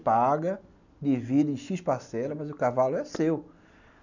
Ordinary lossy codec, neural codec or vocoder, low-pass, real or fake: none; none; 7.2 kHz; real